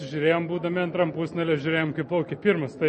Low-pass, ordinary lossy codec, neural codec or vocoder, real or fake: 10.8 kHz; MP3, 32 kbps; none; real